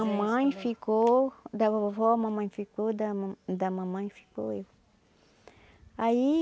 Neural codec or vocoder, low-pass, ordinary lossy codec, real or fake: none; none; none; real